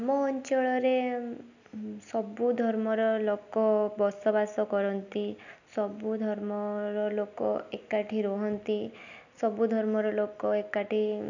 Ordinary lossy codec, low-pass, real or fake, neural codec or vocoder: none; 7.2 kHz; real; none